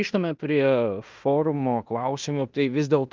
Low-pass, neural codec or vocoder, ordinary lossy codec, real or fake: 7.2 kHz; codec, 16 kHz in and 24 kHz out, 0.9 kbps, LongCat-Audio-Codec, fine tuned four codebook decoder; Opus, 24 kbps; fake